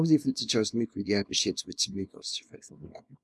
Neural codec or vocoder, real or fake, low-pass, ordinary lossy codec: codec, 24 kHz, 0.9 kbps, WavTokenizer, small release; fake; none; none